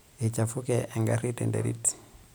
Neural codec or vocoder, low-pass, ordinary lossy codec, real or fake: none; none; none; real